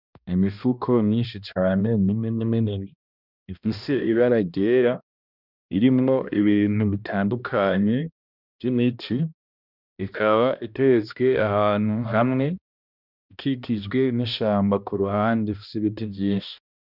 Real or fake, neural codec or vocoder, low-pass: fake; codec, 16 kHz, 1 kbps, X-Codec, HuBERT features, trained on balanced general audio; 5.4 kHz